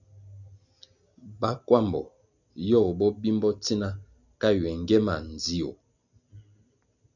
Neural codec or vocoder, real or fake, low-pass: none; real; 7.2 kHz